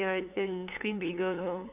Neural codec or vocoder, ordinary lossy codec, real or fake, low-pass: codec, 16 kHz, 8 kbps, FunCodec, trained on LibriTTS, 25 frames a second; none; fake; 3.6 kHz